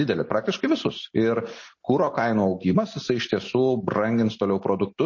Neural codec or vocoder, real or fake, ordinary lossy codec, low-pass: none; real; MP3, 32 kbps; 7.2 kHz